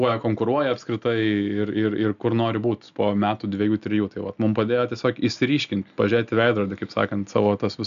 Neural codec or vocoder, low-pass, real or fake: none; 7.2 kHz; real